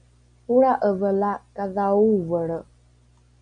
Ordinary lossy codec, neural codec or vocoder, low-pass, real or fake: MP3, 96 kbps; none; 9.9 kHz; real